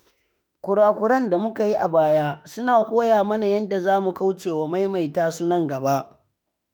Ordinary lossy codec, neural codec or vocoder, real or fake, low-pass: none; autoencoder, 48 kHz, 32 numbers a frame, DAC-VAE, trained on Japanese speech; fake; none